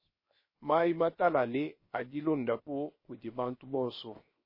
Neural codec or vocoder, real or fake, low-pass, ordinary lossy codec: codec, 16 kHz, 0.7 kbps, FocalCodec; fake; 5.4 kHz; MP3, 24 kbps